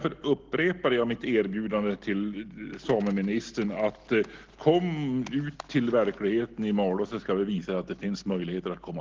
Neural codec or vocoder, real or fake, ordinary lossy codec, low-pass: none; real; Opus, 16 kbps; 7.2 kHz